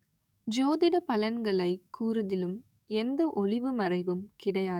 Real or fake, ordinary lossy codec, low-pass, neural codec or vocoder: fake; none; 19.8 kHz; codec, 44.1 kHz, 7.8 kbps, DAC